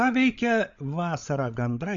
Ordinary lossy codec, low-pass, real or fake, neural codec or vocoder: Opus, 64 kbps; 7.2 kHz; fake; codec, 16 kHz, 16 kbps, FreqCodec, larger model